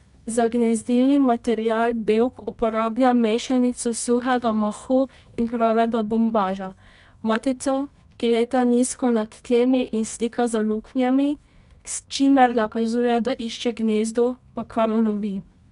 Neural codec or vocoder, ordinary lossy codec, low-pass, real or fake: codec, 24 kHz, 0.9 kbps, WavTokenizer, medium music audio release; none; 10.8 kHz; fake